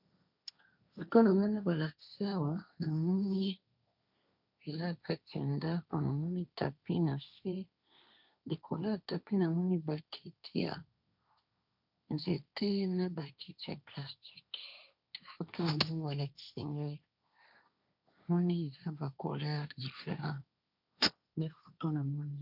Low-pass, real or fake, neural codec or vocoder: 5.4 kHz; fake; codec, 16 kHz, 1.1 kbps, Voila-Tokenizer